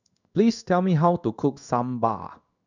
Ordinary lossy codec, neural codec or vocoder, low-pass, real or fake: none; codec, 16 kHz in and 24 kHz out, 1 kbps, XY-Tokenizer; 7.2 kHz; fake